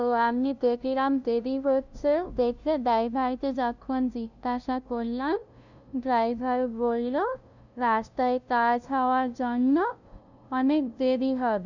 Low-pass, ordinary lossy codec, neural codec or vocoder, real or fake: 7.2 kHz; none; codec, 16 kHz, 0.5 kbps, FunCodec, trained on LibriTTS, 25 frames a second; fake